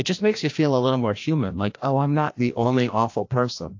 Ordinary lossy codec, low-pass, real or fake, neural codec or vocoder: AAC, 48 kbps; 7.2 kHz; fake; codec, 16 kHz, 1 kbps, FreqCodec, larger model